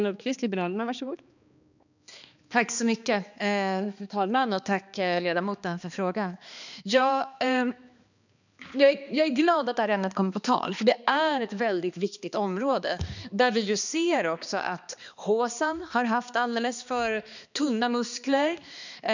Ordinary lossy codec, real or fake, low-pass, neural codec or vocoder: none; fake; 7.2 kHz; codec, 16 kHz, 2 kbps, X-Codec, HuBERT features, trained on balanced general audio